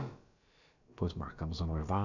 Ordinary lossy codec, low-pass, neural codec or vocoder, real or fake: none; 7.2 kHz; codec, 16 kHz, about 1 kbps, DyCAST, with the encoder's durations; fake